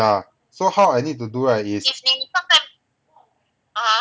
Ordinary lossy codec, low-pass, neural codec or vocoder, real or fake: none; none; none; real